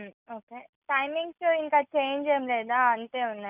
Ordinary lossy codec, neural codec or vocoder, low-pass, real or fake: none; none; 3.6 kHz; real